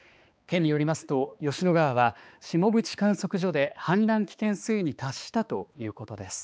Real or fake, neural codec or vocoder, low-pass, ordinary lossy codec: fake; codec, 16 kHz, 2 kbps, X-Codec, HuBERT features, trained on balanced general audio; none; none